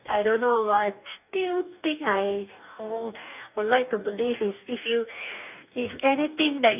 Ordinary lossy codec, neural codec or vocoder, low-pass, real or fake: none; codec, 44.1 kHz, 2.6 kbps, DAC; 3.6 kHz; fake